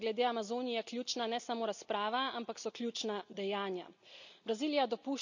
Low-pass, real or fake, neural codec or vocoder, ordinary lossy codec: 7.2 kHz; real; none; none